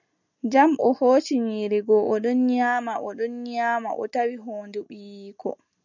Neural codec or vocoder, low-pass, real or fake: none; 7.2 kHz; real